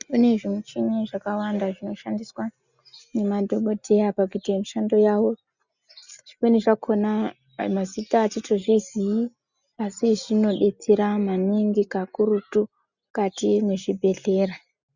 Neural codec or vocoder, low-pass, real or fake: none; 7.2 kHz; real